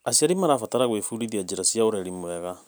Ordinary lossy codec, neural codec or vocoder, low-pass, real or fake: none; none; none; real